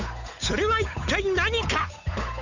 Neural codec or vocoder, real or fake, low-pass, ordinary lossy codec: codec, 16 kHz, 8 kbps, FunCodec, trained on Chinese and English, 25 frames a second; fake; 7.2 kHz; none